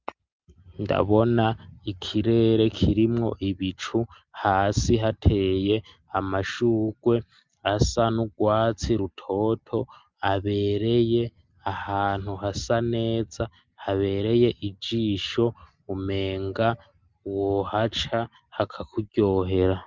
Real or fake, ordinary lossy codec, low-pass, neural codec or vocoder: real; Opus, 24 kbps; 7.2 kHz; none